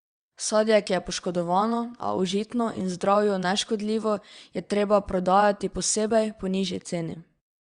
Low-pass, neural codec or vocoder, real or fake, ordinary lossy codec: 9.9 kHz; vocoder, 22.05 kHz, 80 mel bands, WaveNeXt; fake; Opus, 64 kbps